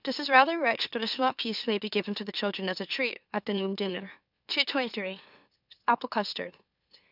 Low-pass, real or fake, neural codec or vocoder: 5.4 kHz; fake; autoencoder, 44.1 kHz, a latent of 192 numbers a frame, MeloTTS